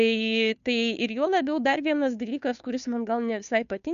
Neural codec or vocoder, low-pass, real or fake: codec, 16 kHz, 2 kbps, FunCodec, trained on Chinese and English, 25 frames a second; 7.2 kHz; fake